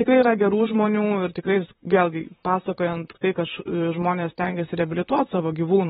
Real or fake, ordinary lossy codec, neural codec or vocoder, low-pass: fake; AAC, 16 kbps; vocoder, 44.1 kHz, 128 mel bands, Pupu-Vocoder; 19.8 kHz